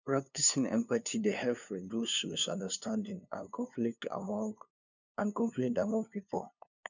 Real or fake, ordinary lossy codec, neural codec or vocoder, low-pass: fake; none; codec, 16 kHz, 2 kbps, FunCodec, trained on LibriTTS, 25 frames a second; 7.2 kHz